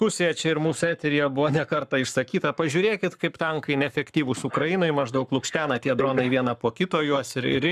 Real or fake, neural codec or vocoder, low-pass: fake; codec, 44.1 kHz, 7.8 kbps, Pupu-Codec; 14.4 kHz